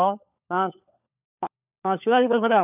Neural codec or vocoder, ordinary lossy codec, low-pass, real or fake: codec, 16 kHz, 4 kbps, FunCodec, trained on LibriTTS, 50 frames a second; none; 3.6 kHz; fake